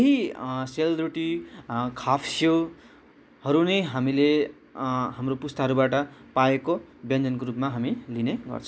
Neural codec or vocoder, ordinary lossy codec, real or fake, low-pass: none; none; real; none